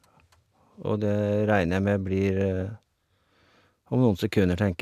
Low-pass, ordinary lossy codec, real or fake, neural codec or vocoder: 14.4 kHz; AAC, 96 kbps; real; none